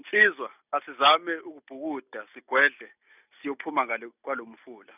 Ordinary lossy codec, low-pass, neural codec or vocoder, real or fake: none; 3.6 kHz; none; real